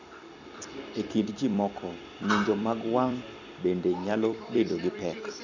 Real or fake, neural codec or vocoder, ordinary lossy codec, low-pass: fake; vocoder, 44.1 kHz, 128 mel bands every 256 samples, BigVGAN v2; none; 7.2 kHz